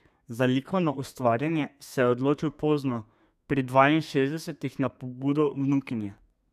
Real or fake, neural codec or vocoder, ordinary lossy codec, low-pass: fake; codec, 32 kHz, 1.9 kbps, SNAC; none; 14.4 kHz